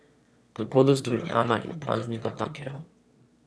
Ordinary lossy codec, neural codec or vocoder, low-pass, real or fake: none; autoencoder, 22.05 kHz, a latent of 192 numbers a frame, VITS, trained on one speaker; none; fake